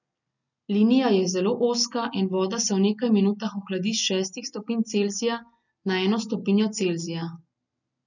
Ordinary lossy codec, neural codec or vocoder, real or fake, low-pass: none; none; real; 7.2 kHz